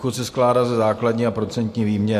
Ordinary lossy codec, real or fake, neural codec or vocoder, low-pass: AAC, 64 kbps; real; none; 14.4 kHz